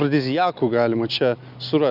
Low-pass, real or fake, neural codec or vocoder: 5.4 kHz; real; none